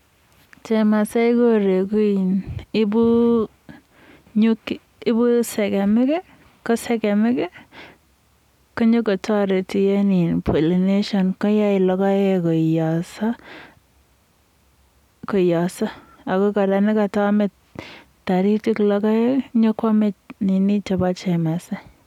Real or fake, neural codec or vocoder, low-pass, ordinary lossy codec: real; none; 19.8 kHz; none